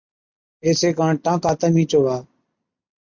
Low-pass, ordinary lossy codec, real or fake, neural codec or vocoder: 7.2 kHz; MP3, 64 kbps; real; none